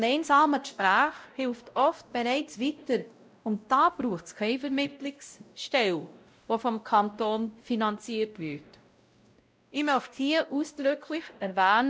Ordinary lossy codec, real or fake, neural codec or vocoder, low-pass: none; fake; codec, 16 kHz, 0.5 kbps, X-Codec, WavLM features, trained on Multilingual LibriSpeech; none